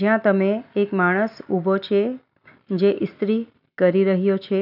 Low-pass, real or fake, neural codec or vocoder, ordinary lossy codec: 5.4 kHz; real; none; none